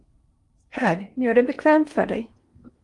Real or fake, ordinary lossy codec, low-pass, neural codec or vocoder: fake; Opus, 32 kbps; 10.8 kHz; codec, 16 kHz in and 24 kHz out, 0.8 kbps, FocalCodec, streaming, 65536 codes